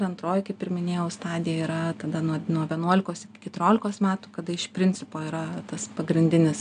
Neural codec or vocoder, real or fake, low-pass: none; real; 9.9 kHz